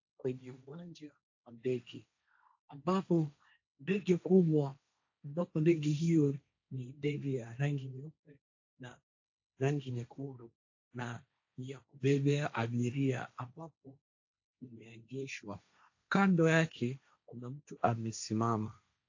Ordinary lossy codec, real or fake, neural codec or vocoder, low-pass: AAC, 48 kbps; fake; codec, 16 kHz, 1.1 kbps, Voila-Tokenizer; 7.2 kHz